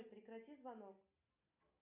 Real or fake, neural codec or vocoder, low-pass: real; none; 3.6 kHz